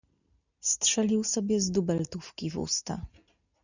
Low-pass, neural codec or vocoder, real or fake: 7.2 kHz; none; real